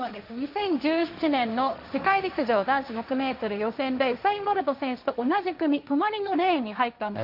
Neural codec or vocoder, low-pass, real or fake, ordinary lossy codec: codec, 16 kHz, 1.1 kbps, Voila-Tokenizer; 5.4 kHz; fake; none